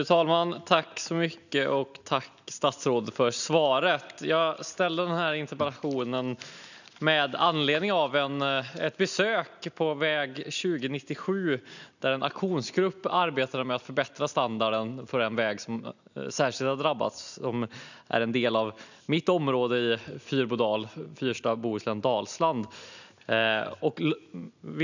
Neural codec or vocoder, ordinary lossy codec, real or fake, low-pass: none; none; real; 7.2 kHz